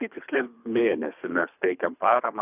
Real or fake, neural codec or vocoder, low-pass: fake; codec, 24 kHz, 3 kbps, HILCodec; 3.6 kHz